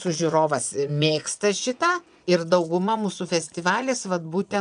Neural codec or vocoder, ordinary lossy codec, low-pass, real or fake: vocoder, 22.05 kHz, 80 mel bands, Vocos; MP3, 96 kbps; 9.9 kHz; fake